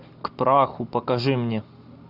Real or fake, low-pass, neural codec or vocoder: real; 5.4 kHz; none